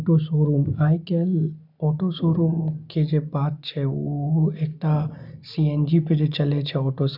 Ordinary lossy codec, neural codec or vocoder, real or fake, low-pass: none; none; real; 5.4 kHz